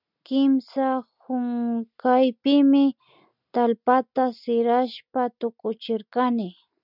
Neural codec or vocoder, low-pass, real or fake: none; 5.4 kHz; real